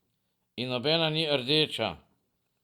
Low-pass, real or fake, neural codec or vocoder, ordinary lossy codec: 19.8 kHz; real; none; Opus, 64 kbps